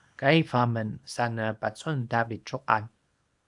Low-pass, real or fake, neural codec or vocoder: 10.8 kHz; fake; codec, 24 kHz, 0.9 kbps, WavTokenizer, small release